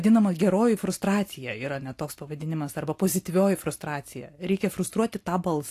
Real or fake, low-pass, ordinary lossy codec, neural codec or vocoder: real; 14.4 kHz; AAC, 64 kbps; none